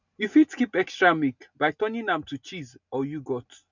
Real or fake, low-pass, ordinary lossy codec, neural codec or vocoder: real; 7.2 kHz; none; none